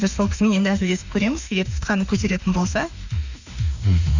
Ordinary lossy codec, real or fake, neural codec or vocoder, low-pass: none; fake; autoencoder, 48 kHz, 32 numbers a frame, DAC-VAE, trained on Japanese speech; 7.2 kHz